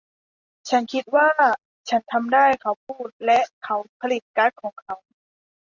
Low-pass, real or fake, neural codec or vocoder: 7.2 kHz; real; none